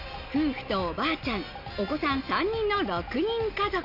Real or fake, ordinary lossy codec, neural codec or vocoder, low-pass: real; none; none; 5.4 kHz